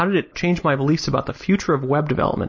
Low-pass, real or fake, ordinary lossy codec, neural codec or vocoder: 7.2 kHz; real; MP3, 32 kbps; none